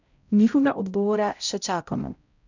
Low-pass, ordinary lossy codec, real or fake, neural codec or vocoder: 7.2 kHz; none; fake; codec, 16 kHz, 0.5 kbps, X-Codec, HuBERT features, trained on balanced general audio